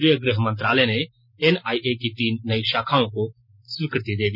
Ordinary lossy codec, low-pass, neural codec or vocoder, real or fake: none; 5.4 kHz; none; real